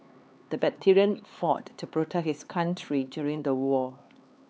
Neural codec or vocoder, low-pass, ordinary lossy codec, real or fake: codec, 16 kHz, 4 kbps, X-Codec, HuBERT features, trained on LibriSpeech; none; none; fake